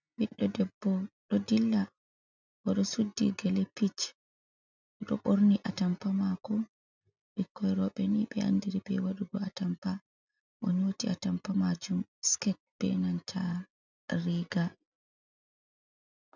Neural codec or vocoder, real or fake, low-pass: none; real; 7.2 kHz